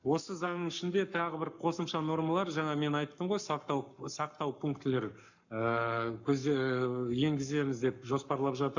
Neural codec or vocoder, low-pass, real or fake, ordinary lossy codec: codec, 44.1 kHz, 7.8 kbps, Pupu-Codec; 7.2 kHz; fake; none